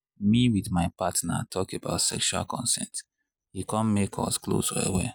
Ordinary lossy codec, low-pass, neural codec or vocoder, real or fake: none; none; none; real